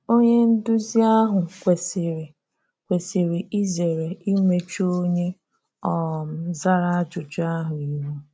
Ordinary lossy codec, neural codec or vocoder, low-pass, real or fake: none; none; none; real